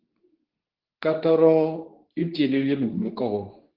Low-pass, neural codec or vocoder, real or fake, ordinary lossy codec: 5.4 kHz; codec, 24 kHz, 0.9 kbps, WavTokenizer, medium speech release version 2; fake; Opus, 16 kbps